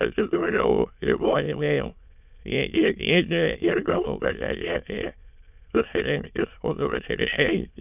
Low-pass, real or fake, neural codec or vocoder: 3.6 kHz; fake; autoencoder, 22.05 kHz, a latent of 192 numbers a frame, VITS, trained on many speakers